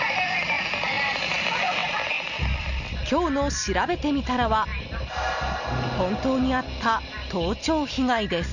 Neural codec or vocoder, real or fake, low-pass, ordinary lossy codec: none; real; 7.2 kHz; none